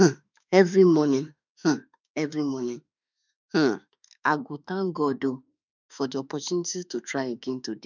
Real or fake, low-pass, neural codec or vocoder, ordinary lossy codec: fake; 7.2 kHz; autoencoder, 48 kHz, 32 numbers a frame, DAC-VAE, trained on Japanese speech; none